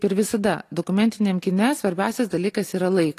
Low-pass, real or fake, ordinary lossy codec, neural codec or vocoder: 14.4 kHz; real; AAC, 48 kbps; none